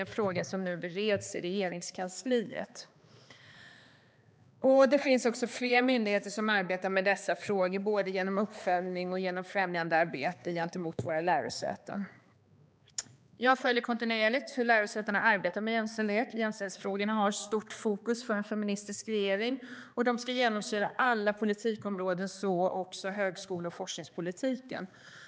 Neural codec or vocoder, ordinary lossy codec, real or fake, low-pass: codec, 16 kHz, 2 kbps, X-Codec, HuBERT features, trained on balanced general audio; none; fake; none